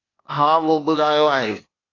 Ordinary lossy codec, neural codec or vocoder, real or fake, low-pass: AAC, 32 kbps; codec, 16 kHz, 0.8 kbps, ZipCodec; fake; 7.2 kHz